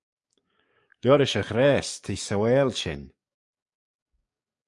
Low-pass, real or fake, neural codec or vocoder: 10.8 kHz; fake; codec, 44.1 kHz, 7.8 kbps, DAC